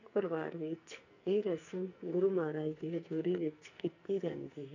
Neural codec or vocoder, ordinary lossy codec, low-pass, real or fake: codec, 44.1 kHz, 2.6 kbps, SNAC; none; 7.2 kHz; fake